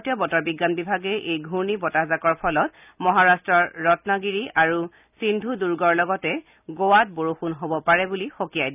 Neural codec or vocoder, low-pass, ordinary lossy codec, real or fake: none; 3.6 kHz; none; real